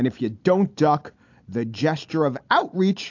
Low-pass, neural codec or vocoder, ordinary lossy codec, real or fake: 7.2 kHz; none; AAC, 48 kbps; real